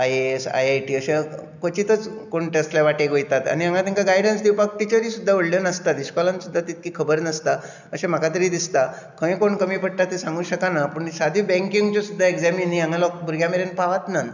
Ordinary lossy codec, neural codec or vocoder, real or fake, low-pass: none; none; real; 7.2 kHz